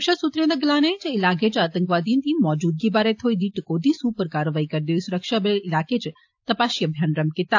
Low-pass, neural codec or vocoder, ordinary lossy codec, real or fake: 7.2 kHz; none; AAC, 48 kbps; real